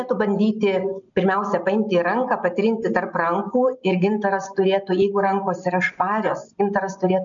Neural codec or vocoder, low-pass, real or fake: none; 7.2 kHz; real